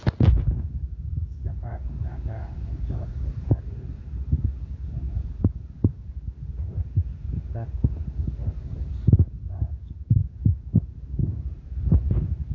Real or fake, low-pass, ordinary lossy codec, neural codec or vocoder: fake; 7.2 kHz; AAC, 32 kbps; codec, 16 kHz in and 24 kHz out, 1 kbps, XY-Tokenizer